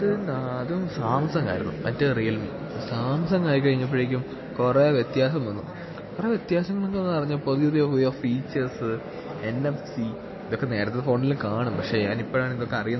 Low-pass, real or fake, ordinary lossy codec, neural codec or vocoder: 7.2 kHz; real; MP3, 24 kbps; none